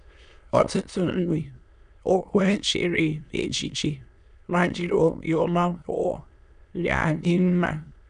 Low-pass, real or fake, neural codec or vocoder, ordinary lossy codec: 9.9 kHz; fake; autoencoder, 22.05 kHz, a latent of 192 numbers a frame, VITS, trained on many speakers; none